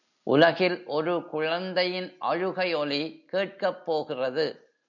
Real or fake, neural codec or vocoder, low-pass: real; none; 7.2 kHz